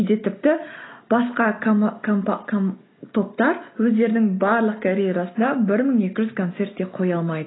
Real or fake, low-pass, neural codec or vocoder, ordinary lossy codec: real; 7.2 kHz; none; AAC, 16 kbps